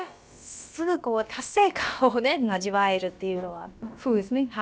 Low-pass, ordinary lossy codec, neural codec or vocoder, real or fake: none; none; codec, 16 kHz, about 1 kbps, DyCAST, with the encoder's durations; fake